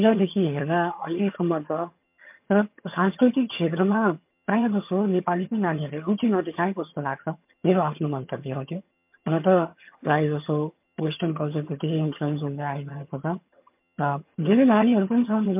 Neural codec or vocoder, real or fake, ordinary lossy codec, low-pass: vocoder, 22.05 kHz, 80 mel bands, HiFi-GAN; fake; MP3, 32 kbps; 3.6 kHz